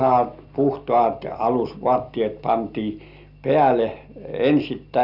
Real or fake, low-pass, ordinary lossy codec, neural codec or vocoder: real; 5.4 kHz; none; none